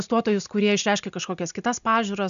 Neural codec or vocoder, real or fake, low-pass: none; real; 7.2 kHz